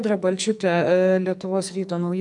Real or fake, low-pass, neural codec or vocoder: fake; 10.8 kHz; codec, 44.1 kHz, 2.6 kbps, SNAC